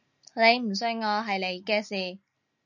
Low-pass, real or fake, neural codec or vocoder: 7.2 kHz; real; none